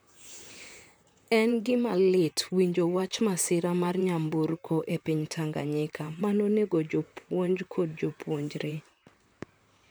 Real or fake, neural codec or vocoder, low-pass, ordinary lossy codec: fake; vocoder, 44.1 kHz, 128 mel bands, Pupu-Vocoder; none; none